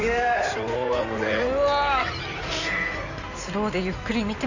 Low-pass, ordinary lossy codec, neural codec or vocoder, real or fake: 7.2 kHz; none; codec, 16 kHz in and 24 kHz out, 2.2 kbps, FireRedTTS-2 codec; fake